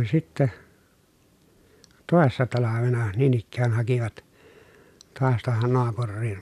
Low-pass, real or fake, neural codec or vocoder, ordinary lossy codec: 14.4 kHz; real; none; none